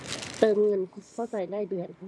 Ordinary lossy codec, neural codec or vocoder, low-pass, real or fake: none; none; none; real